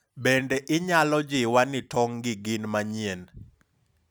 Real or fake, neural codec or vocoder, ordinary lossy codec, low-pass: real; none; none; none